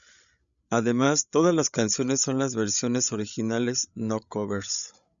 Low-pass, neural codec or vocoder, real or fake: 7.2 kHz; codec, 16 kHz, 8 kbps, FreqCodec, larger model; fake